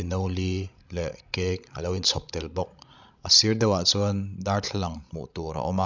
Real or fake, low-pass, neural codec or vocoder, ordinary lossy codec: fake; 7.2 kHz; codec, 16 kHz, 16 kbps, FreqCodec, larger model; none